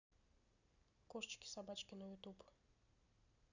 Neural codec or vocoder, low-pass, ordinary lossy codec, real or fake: none; 7.2 kHz; none; real